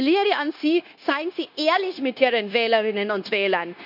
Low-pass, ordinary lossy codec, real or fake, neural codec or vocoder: 5.4 kHz; none; fake; codec, 16 kHz, 0.9 kbps, LongCat-Audio-Codec